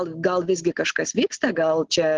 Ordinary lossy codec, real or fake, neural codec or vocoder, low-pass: Opus, 16 kbps; real; none; 7.2 kHz